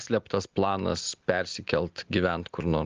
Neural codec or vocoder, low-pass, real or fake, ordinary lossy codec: none; 7.2 kHz; real; Opus, 24 kbps